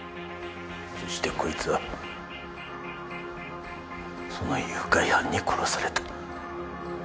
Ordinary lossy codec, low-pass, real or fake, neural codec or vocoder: none; none; real; none